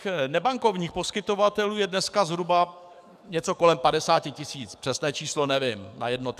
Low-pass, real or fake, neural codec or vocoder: 14.4 kHz; fake; codec, 44.1 kHz, 7.8 kbps, DAC